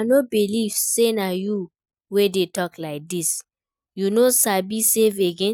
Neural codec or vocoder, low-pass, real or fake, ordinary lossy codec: none; 19.8 kHz; real; none